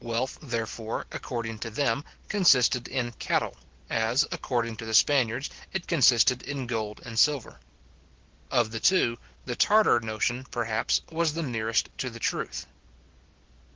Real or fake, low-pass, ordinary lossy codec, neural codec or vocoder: real; 7.2 kHz; Opus, 16 kbps; none